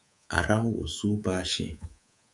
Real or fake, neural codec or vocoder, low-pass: fake; codec, 24 kHz, 3.1 kbps, DualCodec; 10.8 kHz